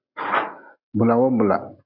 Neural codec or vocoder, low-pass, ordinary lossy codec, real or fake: none; 5.4 kHz; AAC, 48 kbps; real